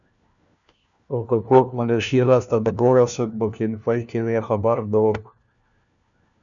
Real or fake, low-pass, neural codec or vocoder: fake; 7.2 kHz; codec, 16 kHz, 1 kbps, FunCodec, trained on LibriTTS, 50 frames a second